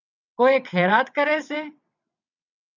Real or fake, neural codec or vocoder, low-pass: fake; vocoder, 22.05 kHz, 80 mel bands, WaveNeXt; 7.2 kHz